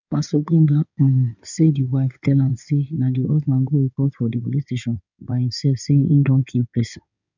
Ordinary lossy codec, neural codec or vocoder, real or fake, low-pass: none; codec, 16 kHz in and 24 kHz out, 2.2 kbps, FireRedTTS-2 codec; fake; 7.2 kHz